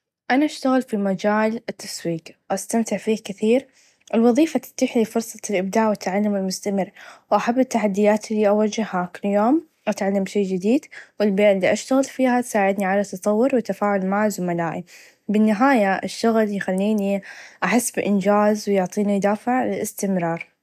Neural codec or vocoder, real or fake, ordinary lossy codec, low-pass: none; real; none; 14.4 kHz